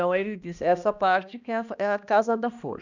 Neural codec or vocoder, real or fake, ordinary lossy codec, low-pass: codec, 16 kHz, 1 kbps, X-Codec, HuBERT features, trained on balanced general audio; fake; none; 7.2 kHz